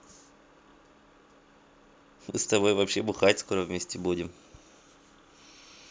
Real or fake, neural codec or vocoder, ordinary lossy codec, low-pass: real; none; none; none